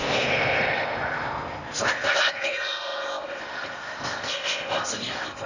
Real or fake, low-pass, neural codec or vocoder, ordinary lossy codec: fake; 7.2 kHz; codec, 16 kHz in and 24 kHz out, 0.8 kbps, FocalCodec, streaming, 65536 codes; none